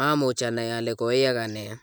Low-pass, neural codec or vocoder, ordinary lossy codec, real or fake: none; none; none; real